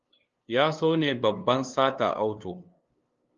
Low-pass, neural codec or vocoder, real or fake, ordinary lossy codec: 7.2 kHz; codec, 16 kHz, 2 kbps, FunCodec, trained on LibriTTS, 25 frames a second; fake; Opus, 32 kbps